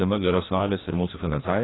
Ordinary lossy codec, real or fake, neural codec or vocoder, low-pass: AAC, 16 kbps; fake; codec, 16 kHz, 1 kbps, FreqCodec, larger model; 7.2 kHz